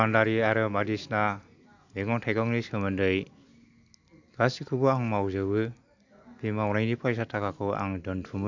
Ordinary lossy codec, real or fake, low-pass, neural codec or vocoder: none; real; 7.2 kHz; none